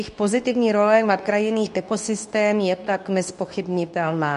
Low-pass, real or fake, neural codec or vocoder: 10.8 kHz; fake; codec, 24 kHz, 0.9 kbps, WavTokenizer, medium speech release version 2